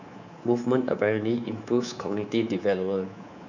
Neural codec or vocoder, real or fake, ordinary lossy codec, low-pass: codec, 24 kHz, 3.1 kbps, DualCodec; fake; none; 7.2 kHz